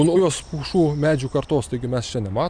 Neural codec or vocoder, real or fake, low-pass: none; real; 9.9 kHz